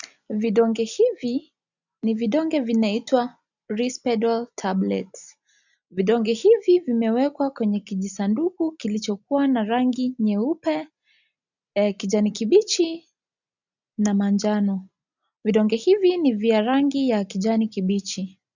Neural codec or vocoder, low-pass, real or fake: none; 7.2 kHz; real